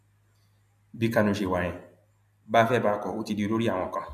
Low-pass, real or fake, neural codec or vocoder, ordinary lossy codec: 14.4 kHz; real; none; MP3, 96 kbps